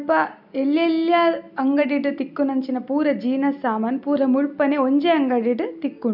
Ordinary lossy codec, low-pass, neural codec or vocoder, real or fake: none; 5.4 kHz; none; real